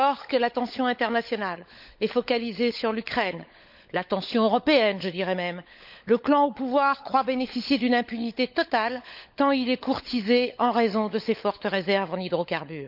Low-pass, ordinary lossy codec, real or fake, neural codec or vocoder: 5.4 kHz; none; fake; codec, 16 kHz, 16 kbps, FunCodec, trained on LibriTTS, 50 frames a second